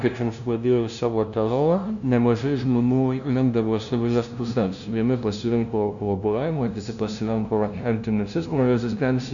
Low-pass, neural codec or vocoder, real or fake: 7.2 kHz; codec, 16 kHz, 0.5 kbps, FunCodec, trained on LibriTTS, 25 frames a second; fake